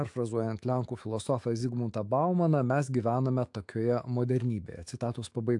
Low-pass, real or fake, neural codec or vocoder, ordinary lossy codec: 10.8 kHz; fake; autoencoder, 48 kHz, 128 numbers a frame, DAC-VAE, trained on Japanese speech; AAC, 64 kbps